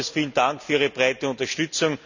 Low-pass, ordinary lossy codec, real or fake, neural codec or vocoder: 7.2 kHz; none; real; none